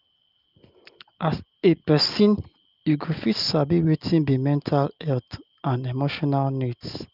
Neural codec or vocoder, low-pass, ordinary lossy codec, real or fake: none; 5.4 kHz; Opus, 32 kbps; real